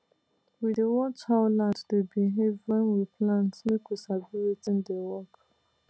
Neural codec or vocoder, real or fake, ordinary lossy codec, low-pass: none; real; none; none